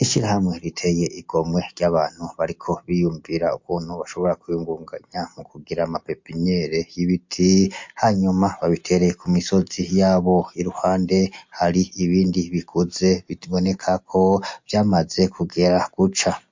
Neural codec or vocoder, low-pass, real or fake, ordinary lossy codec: none; 7.2 kHz; real; MP3, 48 kbps